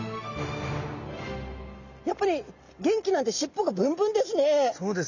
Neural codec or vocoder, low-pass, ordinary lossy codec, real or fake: none; 7.2 kHz; none; real